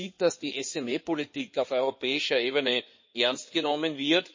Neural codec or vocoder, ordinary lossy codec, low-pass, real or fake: codec, 16 kHz in and 24 kHz out, 2.2 kbps, FireRedTTS-2 codec; MP3, 32 kbps; 7.2 kHz; fake